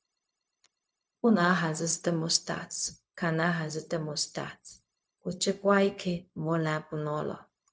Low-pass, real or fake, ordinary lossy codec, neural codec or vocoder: none; fake; none; codec, 16 kHz, 0.4 kbps, LongCat-Audio-Codec